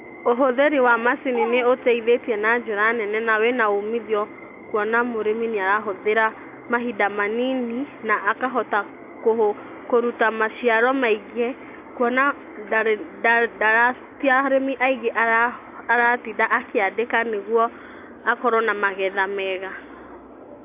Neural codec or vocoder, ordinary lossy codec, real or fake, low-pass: none; AAC, 32 kbps; real; 3.6 kHz